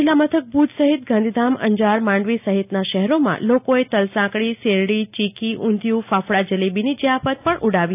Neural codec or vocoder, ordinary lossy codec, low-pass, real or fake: none; AAC, 32 kbps; 3.6 kHz; real